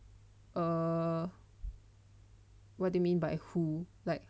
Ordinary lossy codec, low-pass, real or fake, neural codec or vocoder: none; none; real; none